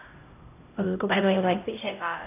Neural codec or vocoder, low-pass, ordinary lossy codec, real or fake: codec, 16 kHz, 0.5 kbps, X-Codec, HuBERT features, trained on LibriSpeech; 3.6 kHz; none; fake